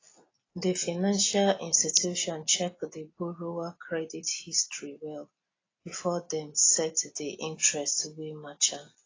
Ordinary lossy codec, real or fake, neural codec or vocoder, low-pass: AAC, 32 kbps; real; none; 7.2 kHz